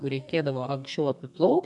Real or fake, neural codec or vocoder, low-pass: fake; codec, 32 kHz, 1.9 kbps, SNAC; 10.8 kHz